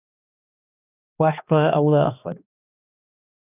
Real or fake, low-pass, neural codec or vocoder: fake; 3.6 kHz; codec, 16 kHz, 2 kbps, X-Codec, HuBERT features, trained on balanced general audio